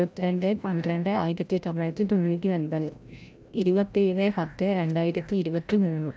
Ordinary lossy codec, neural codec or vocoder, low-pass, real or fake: none; codec, 16 kHz, 0.5 kbps, FreqCodec, larger model; none; fake